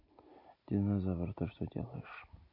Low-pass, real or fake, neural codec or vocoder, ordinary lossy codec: 5.4 kHz; real; none; MP3, 32 kbps